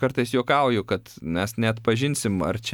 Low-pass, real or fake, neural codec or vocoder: 19.8 kHz; real; none